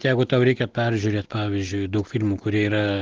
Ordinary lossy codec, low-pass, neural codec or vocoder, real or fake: Opus, 16 kbps; 7.2 kHz; none; real